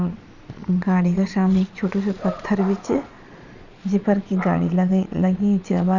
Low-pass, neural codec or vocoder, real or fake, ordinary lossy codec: 7.2 kHz; vocoder, 22.05 kHz, 80 mel bands, Vocos; fake; none